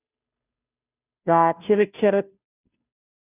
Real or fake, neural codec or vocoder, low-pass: fake; codec, 16 kHz, 0.5 kbps, FunCodec, trained on Chinese and English, 25 frames a second; 3.6 kHz